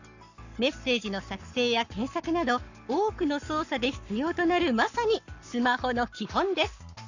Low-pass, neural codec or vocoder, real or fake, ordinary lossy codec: 7.2 kHz; codec, 44.1 kHz, 7.8 kbps, Pupu-Codec; fake; none